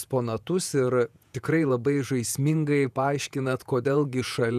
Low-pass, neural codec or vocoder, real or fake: 14.4 kHz; vocoder, 48 kHz, 128 mel bands, Vocos; fake